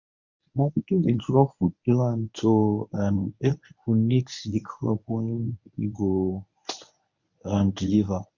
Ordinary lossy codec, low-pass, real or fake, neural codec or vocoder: none; 7.2 kHz; fake; codec, 24 kHz, 0.9 kbps, WavTokenizer, medium speech release version 1